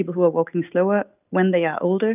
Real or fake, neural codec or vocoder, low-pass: real; none; 3.6 kHz